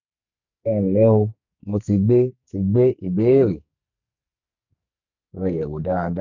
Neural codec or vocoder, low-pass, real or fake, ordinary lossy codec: codec, 44.1 kHz, 2.6 kbps, SNAC; 7.2 kHz; fake; none